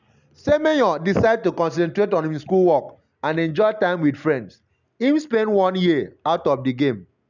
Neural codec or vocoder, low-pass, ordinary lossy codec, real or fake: none; 7.2 kHz; none; real